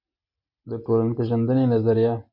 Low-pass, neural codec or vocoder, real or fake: 5.4 kHz; none; real